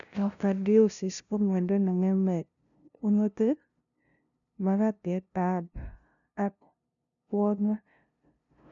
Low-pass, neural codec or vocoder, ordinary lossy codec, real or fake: 7.2 kHz; codec, 16 kHz, 0.5 kbps, FunCodec, trained on LibriTTS, 25 frames a second; none; fake